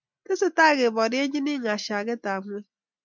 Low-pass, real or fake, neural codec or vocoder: 7.2 kHz; real; none